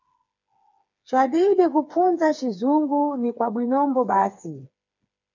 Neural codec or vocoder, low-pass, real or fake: codec, 16 kHz, 4 kbps, FreqCodec, smaller model; 7.2 kHz; fake